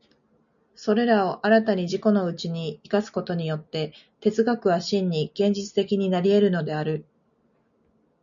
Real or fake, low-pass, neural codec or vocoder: real; 7.2 kHz; none